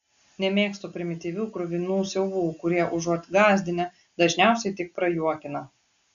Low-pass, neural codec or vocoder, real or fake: 7.2 kHz; none; real